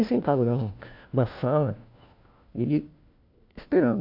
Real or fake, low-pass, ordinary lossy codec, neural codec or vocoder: fake; 5.4 kHz; AAC, 48 kbps; codec, 16 kHz, 1 kbps, FunCodec, trained on LibriTTS, 50 frames a second